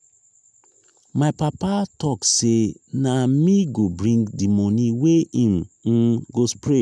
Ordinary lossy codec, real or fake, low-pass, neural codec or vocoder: none; real; none; none